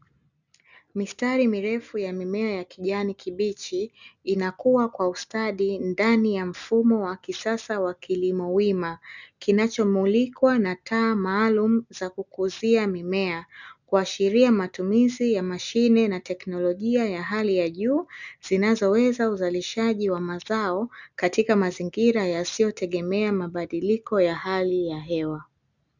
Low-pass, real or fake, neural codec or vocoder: 7.2 kHz; real; none